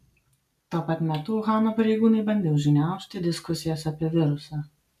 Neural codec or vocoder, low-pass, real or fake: none; 14.4 kHz; real